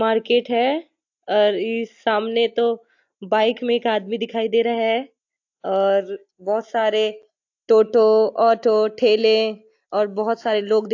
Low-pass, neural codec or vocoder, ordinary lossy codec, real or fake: 7.2 kHz; none; AAC, 48 kbps; real